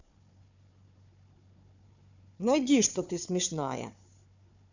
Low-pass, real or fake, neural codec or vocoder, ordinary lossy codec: 7.2 kHz; fake; codec, 16 kHz, 4 kbps, FunCodec, trained on Chinese and English, 50 frames a second; none